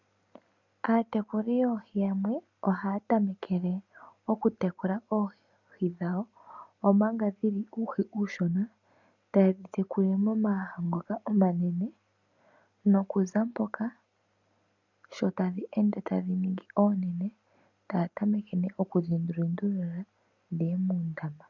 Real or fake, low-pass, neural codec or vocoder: real; 7.2 kHz; none